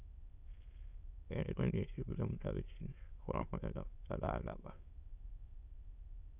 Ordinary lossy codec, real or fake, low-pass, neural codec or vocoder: Opus, 64 kbps; fake; 3.6 kHz; autoencoder, 22.05 kHz, a latent of 192 numbers a frame, VITS, trained on many speakers